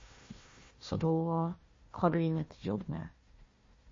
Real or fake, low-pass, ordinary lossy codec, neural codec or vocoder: fake; 7.2 kHz; MP3, 32 kbps; codec, 16 kHz, 1 kbps, FunCodec, trained on Chinese and English, 50 frames a second